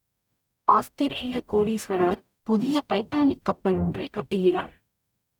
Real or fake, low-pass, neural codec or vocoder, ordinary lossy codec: fake; 19.8 kHz; codec, 44.1 kHz, 0.9 kbps, DAC; none